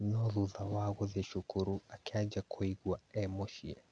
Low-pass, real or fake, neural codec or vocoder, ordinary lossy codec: 9.9 kHz; real; none; none